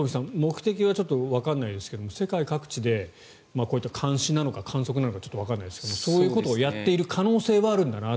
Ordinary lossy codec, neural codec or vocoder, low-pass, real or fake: none; none; none; real